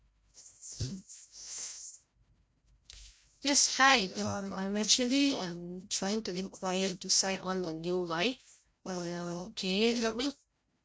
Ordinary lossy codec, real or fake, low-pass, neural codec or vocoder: none; fake; none; codec, 16 kHz, 0.5 kbps, FreqCodec, larger model